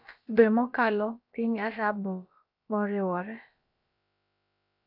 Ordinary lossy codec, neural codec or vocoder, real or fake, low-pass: none; codec, 16 kHz, about 1 kbps, DyCAST, with the encoder's durations; fake; 5.4 kHz